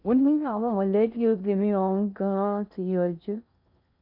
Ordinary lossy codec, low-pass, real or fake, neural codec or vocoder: none; 5.4 kHz; fake; codec, 16 kHz in and 24 kHz out, 0.6 kbps, FocalCodec, streaming, 2048 codes